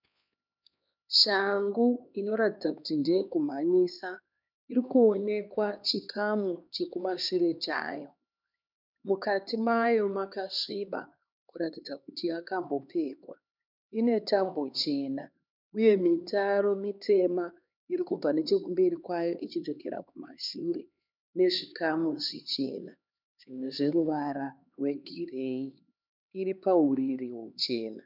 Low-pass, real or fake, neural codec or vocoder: 5.4 kHz; fake; codec, 16 kHz, 2 kbps, X-Codec, HuBERT features, trained on LibriSpeech